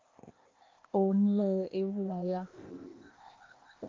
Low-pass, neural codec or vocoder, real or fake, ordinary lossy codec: 7.2 kHz; codec, 16 kHz, 0.8 kbps, ZipCodec; fake; Opus, 32 kbps